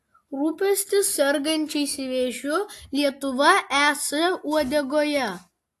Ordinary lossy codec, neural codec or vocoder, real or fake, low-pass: AAC, 64 kbps; none; real; 14.4 kHz